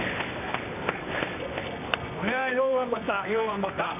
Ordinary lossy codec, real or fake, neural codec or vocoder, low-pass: none; fake; codec, 24 kHz, 0.9 kbps, WavTokenizer, medium music audio release; 3.6 kHz